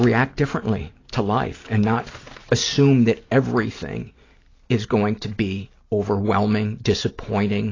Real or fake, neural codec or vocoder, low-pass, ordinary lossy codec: real; none; 7.2 kHz; AAC, 32 kbps